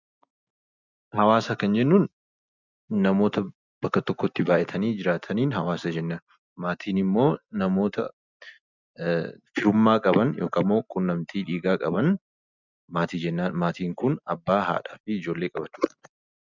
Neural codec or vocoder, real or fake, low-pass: none; real; 7.2 kHz